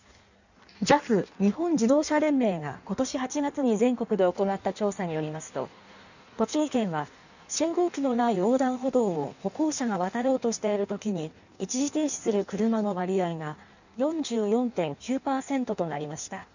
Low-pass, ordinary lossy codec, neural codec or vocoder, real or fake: 7.2 kHz; none; codec, 16 kHz in and 24 kHz out, 1.1 kbps, FireRedTTS-2 codec; fake